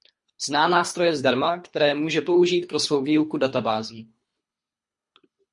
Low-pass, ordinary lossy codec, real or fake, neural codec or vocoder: 10.8 kHz; MP3, 48 kbps; fake; codec, 24 kHz, 3 kbps, HILCodec